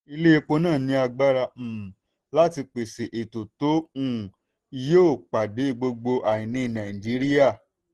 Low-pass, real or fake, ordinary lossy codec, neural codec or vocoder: 10.8 kHz; real; Opus, 16 kbps; none